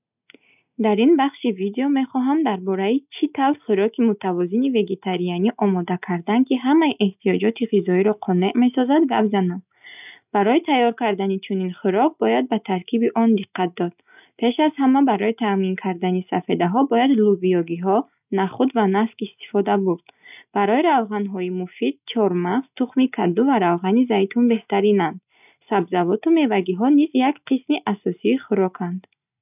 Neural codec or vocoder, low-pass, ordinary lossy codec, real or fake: none; 3.6 kHz; none; real